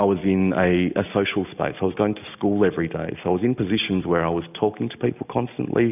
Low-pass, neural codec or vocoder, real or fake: 3.6 kHz; none; real